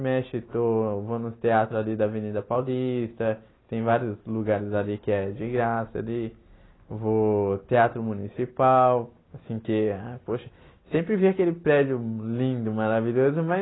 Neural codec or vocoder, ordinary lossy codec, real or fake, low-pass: none; AAC, 16 kbps; real; 7.2 kHz